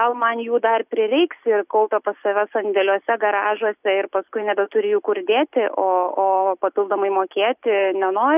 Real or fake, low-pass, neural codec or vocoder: real; 3.6 kHz; none